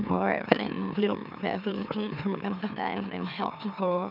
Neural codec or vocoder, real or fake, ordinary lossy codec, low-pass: autoencoder, 44.1 kHz, a latent of 192 numbers a frame, MeloTTS; fake; none; 5.4 kHz